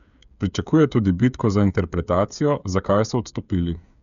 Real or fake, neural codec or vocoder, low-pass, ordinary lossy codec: fake; codec, 16 kHz, 8 kbps, FreqCodec, smaller model; 7.2 kHz; none